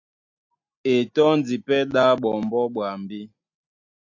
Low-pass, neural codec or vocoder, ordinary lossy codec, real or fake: 7.2 kHz; none; AAC, 48 kbps; real